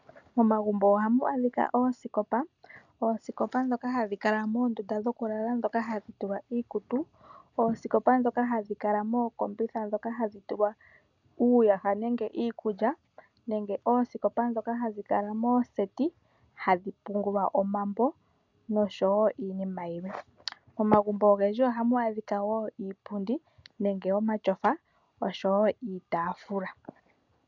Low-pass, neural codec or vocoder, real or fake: 7.2 kHz; none; real